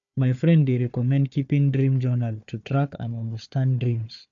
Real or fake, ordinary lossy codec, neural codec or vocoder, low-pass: fake; AAC, 64 kbps; codec, 16 kHz, 4 kbps, FunCodec, trained on Chinese and English, 50 frames a second; 7.2 kHz